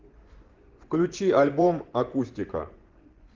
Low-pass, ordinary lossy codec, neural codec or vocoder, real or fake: 7.2 kHz; Opus, 16 kbps; vocoder, 24 kHz, 100 mel bands, Vocos; fake